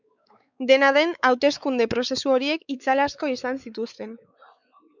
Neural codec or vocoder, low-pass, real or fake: codec, 16 kHz, 4 kbps, X-Codec, WavLM features, trained on Multilingual LibriSpeech; 7.2 kHz; fake